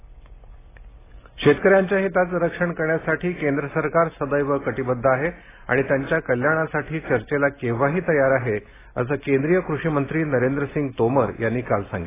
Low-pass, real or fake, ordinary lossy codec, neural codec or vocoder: 3.6 kHz; real; AAC, 16 kbps; none